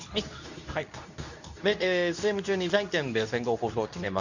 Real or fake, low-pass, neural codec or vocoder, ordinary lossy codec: fake; 7.2 kHz; codec, 24 kHz, 0.9 kbps, WavTokenizer, medium speech release version 2; none